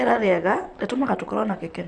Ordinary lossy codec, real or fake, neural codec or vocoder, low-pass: none; fake; vocoder, 44.1 kHz, 128 mel bands, Pupu-Vocoder; 10.8 kHz